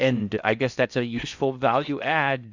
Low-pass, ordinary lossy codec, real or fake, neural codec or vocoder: 7.2 kHz; Opus, 64 kbps; fake; codec, 16 kHz in and 24 kHz out, 0.6 kbps, FocalCodec, streaming, 4096 codes